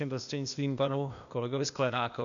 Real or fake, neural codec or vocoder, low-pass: fake; codec, 16 kHz, 0.8 kbps, ZipCodec; 7.2 kHz